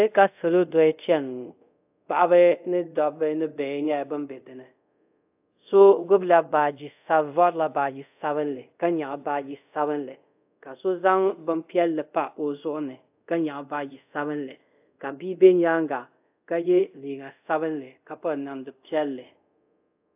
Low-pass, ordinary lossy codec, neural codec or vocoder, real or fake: 3.6 kHz; AAC, 32 kbps; codec, 24 kHz, 0.5 kbps, DualCodec; fake